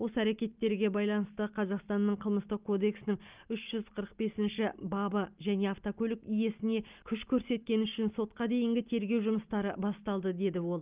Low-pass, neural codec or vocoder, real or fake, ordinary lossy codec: 3.6 kHz; none; real; Opus, 24 kbps